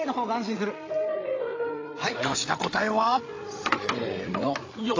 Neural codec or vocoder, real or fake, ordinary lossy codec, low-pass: codec, 16 kHz, 8 kbps, FreqCodec, larger model; fake; AAC, 32 kbps; 7.2 kHz